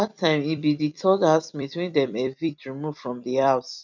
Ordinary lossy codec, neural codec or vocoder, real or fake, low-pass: none; vocoder, 44.1 kHz, 128 mel bands every 256 samples, BigVGAN v2; fake; 7.2 kHz